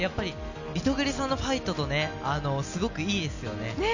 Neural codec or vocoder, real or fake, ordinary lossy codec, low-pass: none; real; none; 7.2 kHz